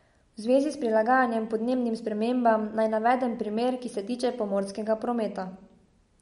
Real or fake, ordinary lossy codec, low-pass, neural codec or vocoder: real; MP3, 48 kbps; 10.8 kHz; none